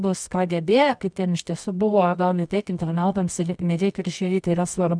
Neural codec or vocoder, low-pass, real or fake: codec, 24 kHz, 0.9 kbps, WavTokenizer, medium music audio release; 9.9 kHz; fake